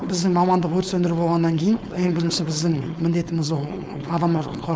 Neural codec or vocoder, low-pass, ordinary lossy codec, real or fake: codec, 16 kHz, 4.8 kbps, FACodec; none; none; fake